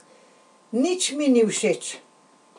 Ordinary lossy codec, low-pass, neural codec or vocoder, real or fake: none; 10.8 kHz; none; real